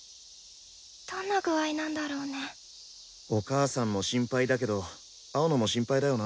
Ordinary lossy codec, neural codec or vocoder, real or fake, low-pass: none; none; real; none